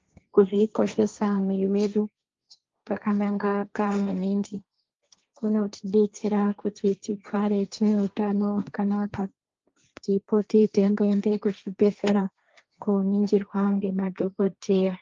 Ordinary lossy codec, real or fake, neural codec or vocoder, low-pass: Opus, 32 kbps; fake; codec, 16 kHz, 1.1 kbps, Voila-Tokenizer; 7.2 kHz